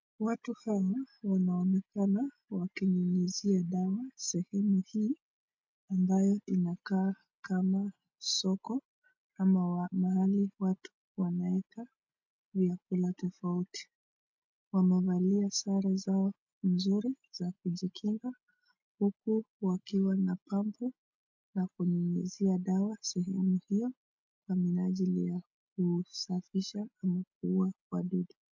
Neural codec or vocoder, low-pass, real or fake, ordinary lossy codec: none; 7.2 kHz; real; MP3, 64 kbps